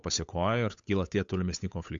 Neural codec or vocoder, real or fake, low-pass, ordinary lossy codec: codec, 16 kHz, 8 kbps, FunCodec, trained on Chinese and English, 25 frames a second; fake; 7.2 kHz; AAC, 64 kbps